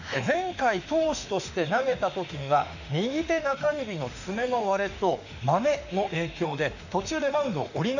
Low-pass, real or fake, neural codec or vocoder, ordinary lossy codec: 7.2 kHz; fake; autoencoder, 48 kHz, 32 numbers a frame, DAC-VAE, trained on Japanese speech; none